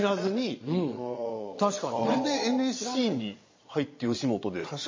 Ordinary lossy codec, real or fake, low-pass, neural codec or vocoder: MP3, 32 kbps; fake; 7.2 kHz; vocoder, 22.05 kHz, 80 mel bands, Vocos